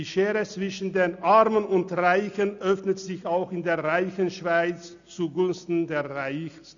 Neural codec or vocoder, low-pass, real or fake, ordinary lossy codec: none; 7.2 kHz; real; none